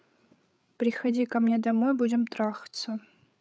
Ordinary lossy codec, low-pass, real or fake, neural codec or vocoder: none; none; fake; codec, 16 kHz, 8 kbps, FreqCodec, larger model